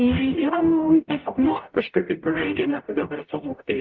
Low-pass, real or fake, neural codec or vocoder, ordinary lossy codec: 7.2 kHz; fake; codec, 44.1 kHz, 0.9 kbps, DAC; Opus, 24 kbps